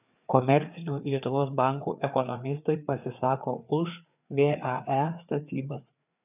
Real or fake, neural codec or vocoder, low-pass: fake; codec, 16 kHz, 4 kbps, FreqCodec, larger model; 3.6 kHz